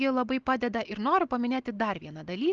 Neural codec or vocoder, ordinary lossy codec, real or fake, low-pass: none; Opus, 32 kbps; real; 7.2 kHz